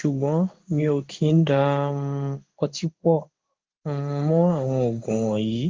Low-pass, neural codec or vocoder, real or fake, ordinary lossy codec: 7.2 kHz; codec, 16 kHz in and 24 kHz out, 1 kbps, XY-Tokenizer; fake; Opus, 32 kbps